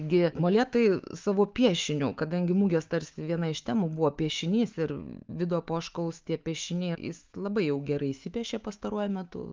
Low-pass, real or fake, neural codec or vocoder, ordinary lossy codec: 7.2 kHz; fake; autoencoder, 48 kHz, 128 numbers a frame, DAC-VAE, trained on Japanese speech; Opus, 24 kbps